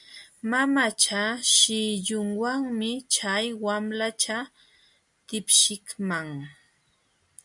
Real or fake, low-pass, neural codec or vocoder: real; 10.8 kHz; none